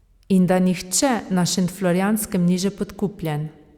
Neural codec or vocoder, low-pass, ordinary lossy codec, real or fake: none; 19.8 kHz; Opus, 64 kbps; real